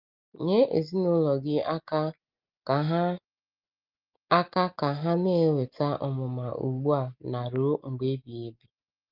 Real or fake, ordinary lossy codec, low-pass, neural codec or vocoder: real; Opus, 32 kbps; 5.4 kHz; none